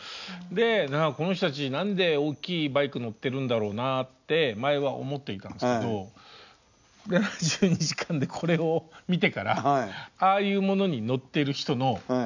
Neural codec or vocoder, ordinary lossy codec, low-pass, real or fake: none; none; 7.2 kHz; real